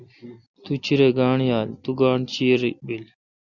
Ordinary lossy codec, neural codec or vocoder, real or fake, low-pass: Opus, 64 kbps; none; real; 7.2 kHz